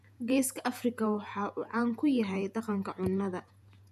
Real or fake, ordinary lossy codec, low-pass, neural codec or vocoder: fake; none; 14.4 kHz; vocoder, 48 kHz, 128 mel bands, Vocos